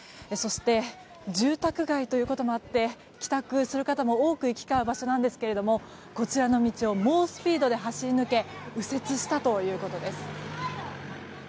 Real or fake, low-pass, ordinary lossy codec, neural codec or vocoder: real; none; none; none